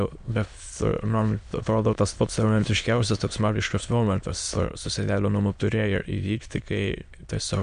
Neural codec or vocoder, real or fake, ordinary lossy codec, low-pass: autoencoder, 22.05 kHz, a latent of 192 numbers a frame, VITS, trained on many speakers; fake; MP3, 64 kbps; 9.9 kHz